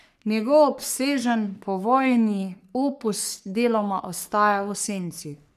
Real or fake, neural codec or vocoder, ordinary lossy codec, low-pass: fake; codec, 44.1 kHz, 3.4 kbps, Pupu-Codec; none; 14.4 kHz